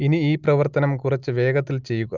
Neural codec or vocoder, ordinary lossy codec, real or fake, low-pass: none; Opus, 32 kbps; real; 7.2 kHz